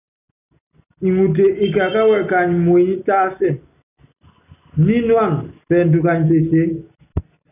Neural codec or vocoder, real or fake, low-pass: none; real; 3.6 kHz